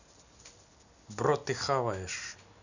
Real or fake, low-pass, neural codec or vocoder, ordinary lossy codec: real; 7.2 kHz; none; none